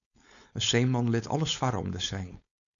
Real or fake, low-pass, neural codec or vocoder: fake; 7.2 kHz; codec, 16 kHz, 4.8 kbps, FACodec